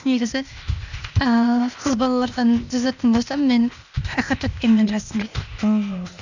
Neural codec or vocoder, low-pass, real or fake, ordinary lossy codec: codec, 16 kHz, 0.8 kbps, ZipCodec; 7.2 kHz; fake; none